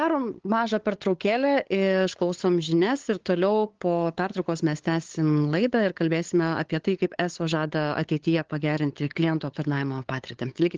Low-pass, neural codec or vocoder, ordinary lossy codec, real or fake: 7.2 kHz; codec, 16 kHz, 8 kbps, FunCodec, trained on LibriTTS, 25 frames a second; Opus, 16 kbps; fake